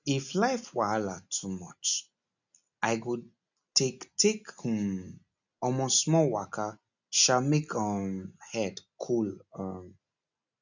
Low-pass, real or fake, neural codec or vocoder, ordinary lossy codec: 7.2 kHz; real; none; none